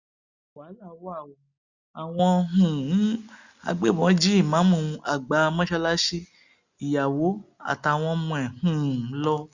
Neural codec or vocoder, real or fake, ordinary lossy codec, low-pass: none; real; Opus, 64 kbps; 7.2 kHz